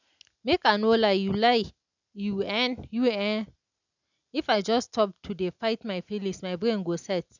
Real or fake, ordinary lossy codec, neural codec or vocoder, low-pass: real; none; none; 7.2 kHz